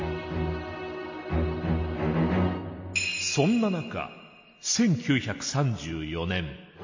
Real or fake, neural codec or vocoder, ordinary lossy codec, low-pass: real; none; MP3, 32 kbps; 7.2 kHz